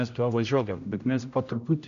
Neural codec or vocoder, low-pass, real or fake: codec, 16 kHz, 0.5 kbps, X-Codec, HuBERT features, trained on general audio; 7.2 kHz; fake